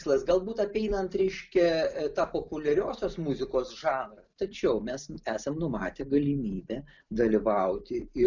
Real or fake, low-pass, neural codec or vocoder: real; 7.2 kHz; none